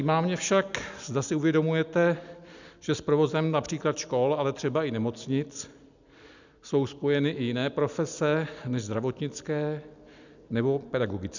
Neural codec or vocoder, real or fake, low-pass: none; real; 7.2 kHz